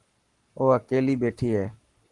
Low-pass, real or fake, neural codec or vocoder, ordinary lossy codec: 10.8 kHz; fake; codec, 44.1 kHz, 7.8 kbps, Pupu-Codec; Opus, 24 kbps